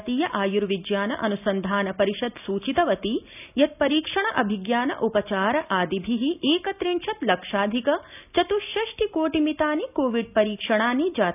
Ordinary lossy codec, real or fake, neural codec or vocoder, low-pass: none; real; none; 3.6 kHz